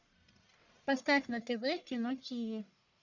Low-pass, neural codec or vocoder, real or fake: 7.2 kHz; codec, 44.1 kHz, 1.7 kbps, Pupu-Codec; fake